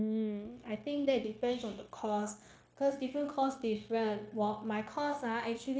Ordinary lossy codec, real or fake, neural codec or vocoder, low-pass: none; fake; codec, 16 kHz, 0.9 kbps, LongCat-Audio-Codec; none